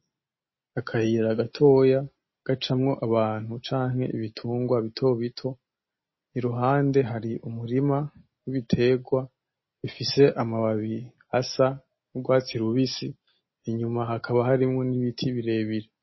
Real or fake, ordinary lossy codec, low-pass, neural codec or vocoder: real; MP3, 24 kbps; 7.2 kHz; none